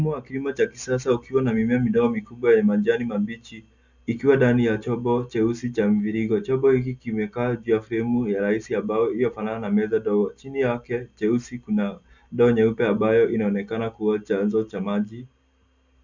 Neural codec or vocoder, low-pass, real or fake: none; 7.2 kHz; real